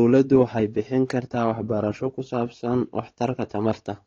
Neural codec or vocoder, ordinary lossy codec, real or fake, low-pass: codec, 16 kHz, 4 kbps, X-Codec, WavLM features, trained on Multilingual LibriSpeech; AAC, 32 kbps; fake; 7.2 kHz